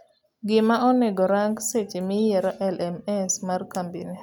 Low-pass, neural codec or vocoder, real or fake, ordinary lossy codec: 19.8 kHz; none; real; none